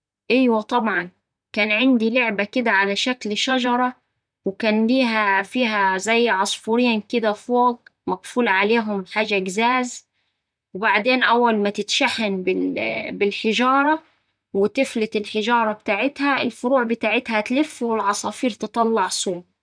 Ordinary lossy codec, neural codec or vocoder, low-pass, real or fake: none; vocoder, 44.1 kHz, 128 mel bands, Pupu-Vocoder; 9.9 kHz; fake